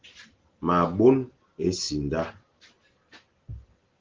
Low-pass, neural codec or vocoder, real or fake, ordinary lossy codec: 7.2 kHz; none; real; Opus, 16 kbps